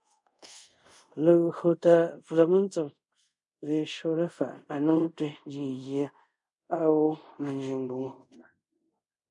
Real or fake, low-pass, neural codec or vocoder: fake; 10.8 kHz; codec, 24 kHz, 0.5 kbps, DualCodec